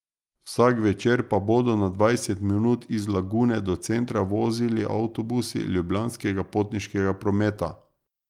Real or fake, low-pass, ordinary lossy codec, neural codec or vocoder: real; 19.8 kHz; Opus, 32 kbps; none